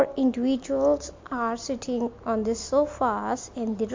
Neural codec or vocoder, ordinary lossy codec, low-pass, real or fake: none; MP3, 48 kbps; 7.2 kHz; real